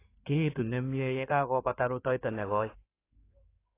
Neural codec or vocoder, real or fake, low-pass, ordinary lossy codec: codec, 16 kHz in and 24 kHz out, 2.2 kbps, FireRedTTS-2 codec; fake; 3.6 kHz; AAC, 24 kbps